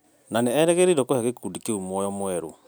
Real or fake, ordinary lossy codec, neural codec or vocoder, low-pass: real; none; none; none